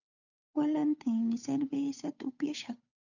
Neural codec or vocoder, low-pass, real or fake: codec, 16 kHz, 8 kbps, FunCodec, trained on Chinese and English, 25 frames a second; 7.2 kHz; fake